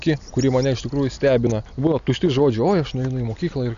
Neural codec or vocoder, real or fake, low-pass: none; real; 7.2 kHz